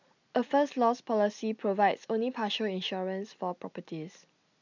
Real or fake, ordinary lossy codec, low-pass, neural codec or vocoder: real; none; 7.2 kHz; none